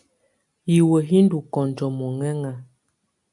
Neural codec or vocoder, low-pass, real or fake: none; 10.8 kHz; real